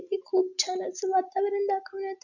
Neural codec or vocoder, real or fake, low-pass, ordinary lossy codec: none; real; 7.2 kHz; none